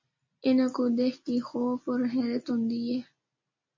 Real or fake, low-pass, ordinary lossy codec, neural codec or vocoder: real; 7.2 kHz; MP3, 32 kbps; none